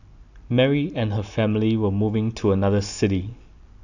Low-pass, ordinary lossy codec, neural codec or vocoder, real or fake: 7.2 kHz; none; none; real